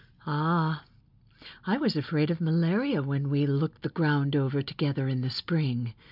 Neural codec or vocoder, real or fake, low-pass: none; real; 5.4 kHz